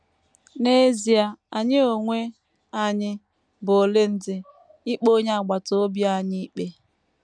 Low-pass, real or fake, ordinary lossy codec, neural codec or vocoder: 9.9 kHz; real; none; none